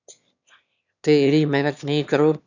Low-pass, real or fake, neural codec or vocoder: 7.2 kHz; fake; autoencoder, 22.05 kHz, a latent of 192 numbers a frame, VITS, trained on one speaker